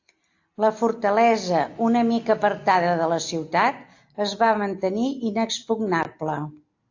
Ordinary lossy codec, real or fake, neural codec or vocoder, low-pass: AAC, 48 kbps; real; none; 7.2 kHz